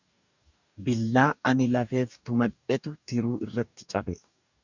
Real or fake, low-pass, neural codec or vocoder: fake; 7.2 kHz; codec, 44.1 kHz, 2.6 kbps, DAC